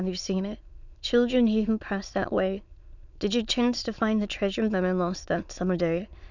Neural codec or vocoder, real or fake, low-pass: autoencoder, 22.05 kHz, a latent of 192 numbers a frame, VITS, trained on many speakers; fake; 7.2 kHz